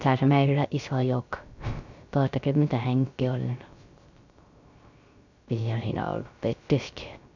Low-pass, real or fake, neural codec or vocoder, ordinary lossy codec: 7.2 kHz; fake; codec, 16 kHz, 0.3 kbps, FocalCodec; none